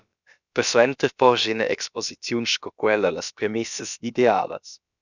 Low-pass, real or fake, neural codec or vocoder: 7.2 kHz; fake; codec, 16 kHz, about 1 kbps, DyCAST, with the encoder's durations